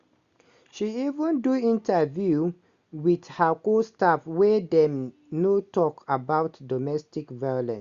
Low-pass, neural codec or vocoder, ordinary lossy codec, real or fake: 7.2 kHz; none; Opus, 64 kbps; real